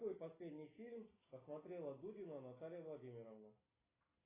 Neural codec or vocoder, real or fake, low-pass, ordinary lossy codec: none; real; 3.6 kHz; AAC, 16 kbps